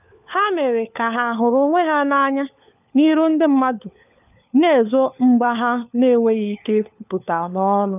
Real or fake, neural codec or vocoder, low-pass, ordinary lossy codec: fake; codec, 16 kHz, 4 kbps, FunCodec, trained on LibriTTS, 50 frames a second; 3.6 kHz; none